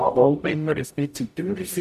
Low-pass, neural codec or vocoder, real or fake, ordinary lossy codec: 14.4 kHz; codec, 44.1 kHz, 0.9 kbps, DAC; fake; none